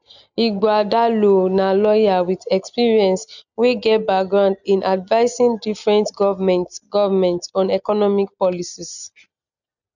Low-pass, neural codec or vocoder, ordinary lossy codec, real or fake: 7.2 kHz; none; none; real